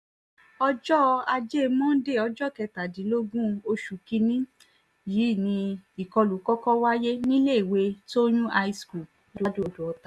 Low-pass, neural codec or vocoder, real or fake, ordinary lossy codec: none; none; real; none